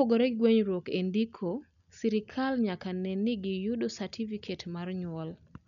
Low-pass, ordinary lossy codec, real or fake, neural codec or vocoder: 7.2 kHz; none; real; none